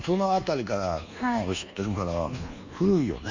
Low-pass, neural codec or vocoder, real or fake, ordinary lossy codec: 7.2 kHz; codec, 24 kHz, 1.2 kbps, DualCodec; fake; Opus, 64 kbps